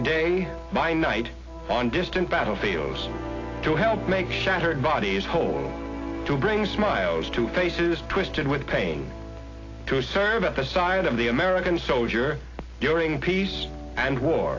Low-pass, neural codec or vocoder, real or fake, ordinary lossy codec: 7.2 kHz; none; real; AAC, 32 kbps